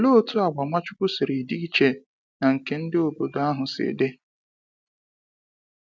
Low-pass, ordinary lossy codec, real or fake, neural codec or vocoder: none; none; real; none